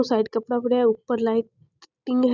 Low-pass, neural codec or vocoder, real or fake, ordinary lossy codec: 7.2 kHz; none; real; none